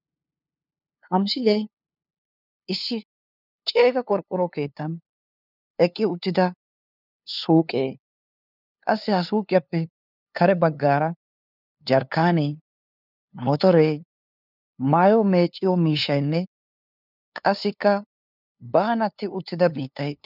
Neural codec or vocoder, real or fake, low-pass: codec, 16 kHz, 2 kbps, FunCodec, trained on LibriTTS, 25 frames a second; fake; 5.4 kHz